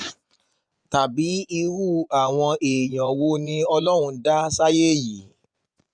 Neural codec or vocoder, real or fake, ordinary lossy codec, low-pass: vocoder, 24 kHz, 100 mel bands, Vocos; fake; none; 9.9 kHz